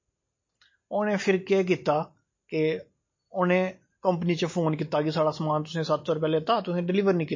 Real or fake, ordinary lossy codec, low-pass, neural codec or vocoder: real; MP3, 32 kbps; 7.2 kHz; none